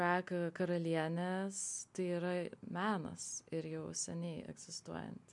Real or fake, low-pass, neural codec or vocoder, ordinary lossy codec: real; 10.8 kHz; none; MP3, 64 kbps